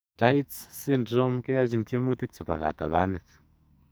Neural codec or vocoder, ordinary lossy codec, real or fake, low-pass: codec, 44.1 kHz, 2.6 kbps, SNAC; none; fake; none